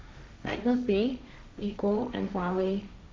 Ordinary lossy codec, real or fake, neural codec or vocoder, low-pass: none; fake; codec, 16 kHz, 1.1 kbps, Voila-Tokenizer; none